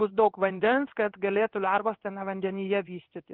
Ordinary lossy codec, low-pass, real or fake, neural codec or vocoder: Opus, 32 kbps; 5.4 kHz; fake; codec, 16 kHz in and 24 kHz out, 1 kbps, XY-Tokenizer